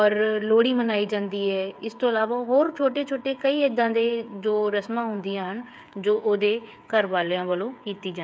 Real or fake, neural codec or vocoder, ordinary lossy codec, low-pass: fake; codec, 16 kHz, 8 kbps, FreqCodec, smaller model; none; none